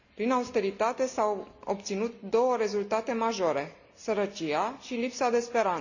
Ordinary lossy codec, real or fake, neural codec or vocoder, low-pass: none; real; none; 7.2 kHz